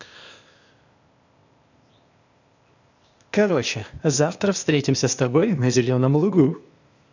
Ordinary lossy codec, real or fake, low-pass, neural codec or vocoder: none; fake; 7.2 kHz; codec, 16 kHz, 0.8 kbps, ZipCodec